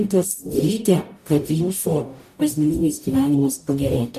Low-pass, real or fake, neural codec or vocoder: 14.4 kHz; fake; codec, 44.1 kHz, 0.9 kbps, DAC